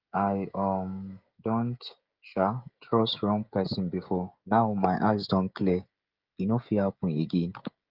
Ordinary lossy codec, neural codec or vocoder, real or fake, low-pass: Opus, 24 kbps; codec, 16 kHz, 16 kbps, FreqCodec, smaller model; fake; 5.4 kHz